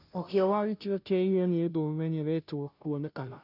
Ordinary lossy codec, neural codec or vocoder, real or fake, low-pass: none; codec, 16 kHz, 0.5 kbps, FunCodec, trained on Chinese and English, 25 frames a second; fake; 5.4 kHz